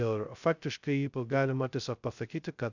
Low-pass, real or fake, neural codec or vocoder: 7.2 kHz; fake; codec, 16 kHz, 0.2 kbps, FocalCodec